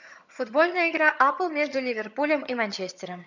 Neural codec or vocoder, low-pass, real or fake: vocoder, 22.05 kHz, 80 mel bands, HiFi-GAN; 7.2 kHz; fake